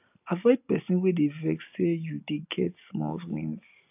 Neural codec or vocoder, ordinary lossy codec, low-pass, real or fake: none; AAC, 32 kbps; 3.6 kHz; real